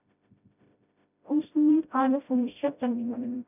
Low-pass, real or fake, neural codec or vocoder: 3.6 kHz; fake; codec, 16 kHz, 0.5 kbps, FreqCodec, smaller model